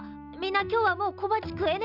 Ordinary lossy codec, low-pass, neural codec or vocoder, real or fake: none; 5.4 kHz; none; real